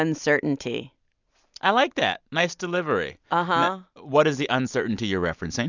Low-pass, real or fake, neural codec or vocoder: 7.2 kHz; real; none